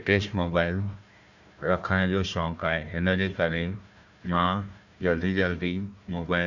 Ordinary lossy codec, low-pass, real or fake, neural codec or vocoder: none; 7.2 kHz; fake; codec, 16 kHz, 1 kbps, FunCodec, trained on Chinese and English, 50 frames a second